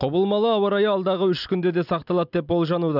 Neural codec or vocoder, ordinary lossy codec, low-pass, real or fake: none; none; 5.4 kHz; real